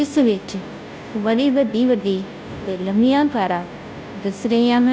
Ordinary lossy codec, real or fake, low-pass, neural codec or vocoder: none; fake; none; codec, 16 kHz, 0.5 kbps, FunCodec, trained on Chinese and English, 25 frames a second